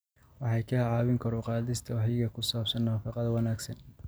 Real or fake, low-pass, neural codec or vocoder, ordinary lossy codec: real; none; none; none